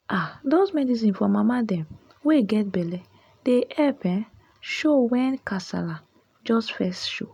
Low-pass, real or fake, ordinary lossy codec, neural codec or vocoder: 19.8 kHz; real; none; none